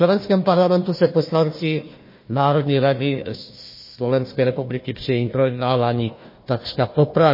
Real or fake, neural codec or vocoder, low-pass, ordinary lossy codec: fake; codec, 16 kHz, 1 kbps, FunCodec, trained on Chinese and English, 50 frames a second; 5.4 kHz; MP3, 24 kbps